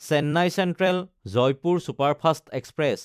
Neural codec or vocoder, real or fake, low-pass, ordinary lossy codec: vocoder, 44.1 kHz, 128 mel bands every 256 samples, BigVGAN v2; fake; 14.4 kHz; none